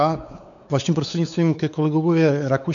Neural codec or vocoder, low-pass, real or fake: codec, 16 kHz, 4 kbps, X-Codec, WavLM features, trained on Multilingual LibriSpeech; 7.2 kHz; fake